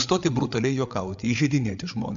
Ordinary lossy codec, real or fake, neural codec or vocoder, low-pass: MP3, 64 kbps; fake; codec, 16 kHz, 8 kbps, FreqCodec, larger model; 7.2 kHz